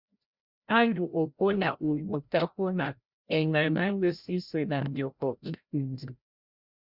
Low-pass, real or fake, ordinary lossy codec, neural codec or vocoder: 5.4 kHz; fake; Opus, 64 kbps; codec, 16 kHz, 0.5 kbps, FreqCodec, larger model